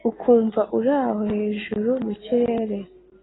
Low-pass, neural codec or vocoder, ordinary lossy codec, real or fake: 7.2 kHz; none; AAC, 16 kbps; real